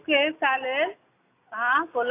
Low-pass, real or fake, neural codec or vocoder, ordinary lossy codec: 3.6 kHz; real; none; AAC, 24 kbps